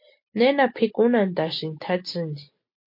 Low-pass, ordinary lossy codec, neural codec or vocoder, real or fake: 5.4 kHz; MP3, 32 kbps; none; real